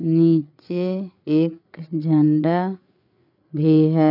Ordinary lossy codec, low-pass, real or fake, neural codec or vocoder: none; 5.4 kHz; fake; codec, 16 kHz, 8 kbps, FreqCodec, larger model